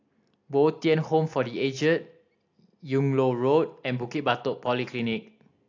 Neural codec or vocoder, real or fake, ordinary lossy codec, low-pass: none; real; AAC, 48 kbps; 7.2 kHz